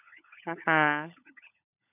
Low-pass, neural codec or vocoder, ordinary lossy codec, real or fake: 3.6 kHz; codec, 16 kHz, 16 kbps, FunCodec, trained on LibriTTS, 50 frames a second; none; fake